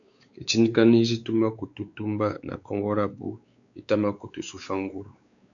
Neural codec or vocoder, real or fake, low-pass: codec, 16 kHz, 4 kbps, X-Codec, WavLM features, trained on Multilingual LibriSpeech; fake; 7.2 kHz